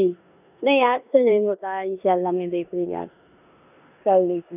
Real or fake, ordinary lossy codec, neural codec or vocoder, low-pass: fake; none; codec, 16 kHz in and 24 kHz out, 0.9 kbps, LongCat-Audio-Codec, four codebook decoder; 3.6 kHz